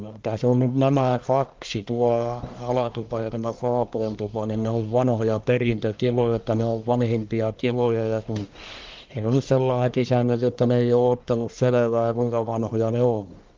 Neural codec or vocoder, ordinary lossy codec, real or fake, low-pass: codec, 44.1 kHz, 1.7 kbps, Pupu-Codec; Opus, 24 kbps; fake; 7.2 kHz